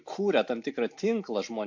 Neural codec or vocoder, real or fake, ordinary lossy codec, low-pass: none; real; MP3, 48 kbps; 7.2 kHz